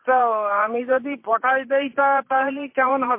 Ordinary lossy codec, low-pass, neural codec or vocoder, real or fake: MP3, 24 kbps; 3.6 kHz; vocoder, 44.1 kHz, 128 mel bands every 512 samples, BigVGAN v2; fake